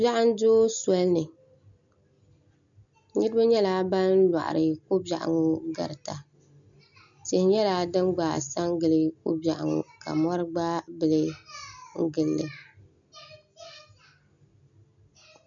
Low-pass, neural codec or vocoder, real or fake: 7.2 kHz; none; real